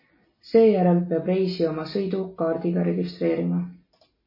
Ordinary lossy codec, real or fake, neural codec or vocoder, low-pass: MP3, 24 kbps; real; none; 5.4 kHz